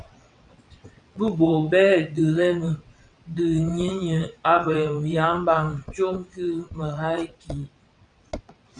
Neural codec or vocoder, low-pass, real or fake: vocoder, 22.05 kHz, 80 mel bands, WaveNeXt; 9.9 kHz; fake